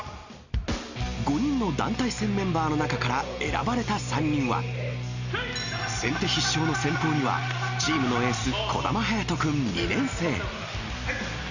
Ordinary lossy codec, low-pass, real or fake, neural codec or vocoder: Opus, 64 kbps; 7.2 kHz; real; none